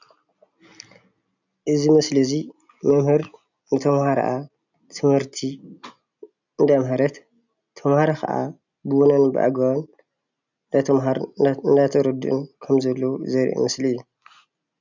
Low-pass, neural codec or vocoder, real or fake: 7.2 kHz; none; real